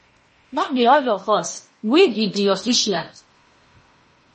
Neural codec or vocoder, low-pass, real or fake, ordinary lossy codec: codec, 16 kHz in and 24 kHz out, 0.8 kbps, FocalCodec, streaming, 65536 codes; 10.8 kHz; fake; MP3, 32 kbps